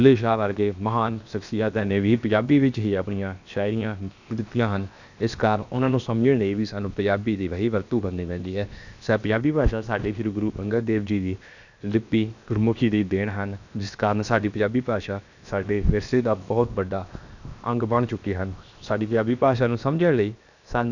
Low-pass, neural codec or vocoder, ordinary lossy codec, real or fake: 7.2 kHz; codec, 16 kHz, 0.7 kbps, FocalCodec; none; fake